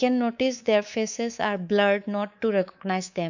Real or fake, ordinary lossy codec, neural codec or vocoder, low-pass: fake; none; codec, 24 kHz, 3.1 kbps, DualCodec; 7.2 kHz